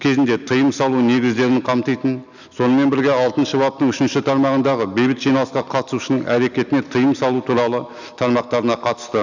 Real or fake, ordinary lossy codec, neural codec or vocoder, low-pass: real; none; none; 7.2 kHz